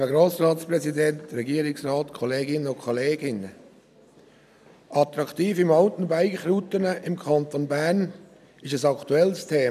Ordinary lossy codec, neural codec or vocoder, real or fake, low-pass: none; none; real; 14.4 kHz